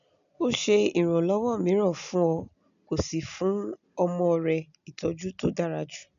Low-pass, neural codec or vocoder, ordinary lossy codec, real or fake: 7.2 kHz; none; none; real